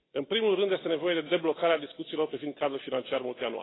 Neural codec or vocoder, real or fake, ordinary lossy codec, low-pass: none; real; AAC, 16 kbps; 7.2 kHz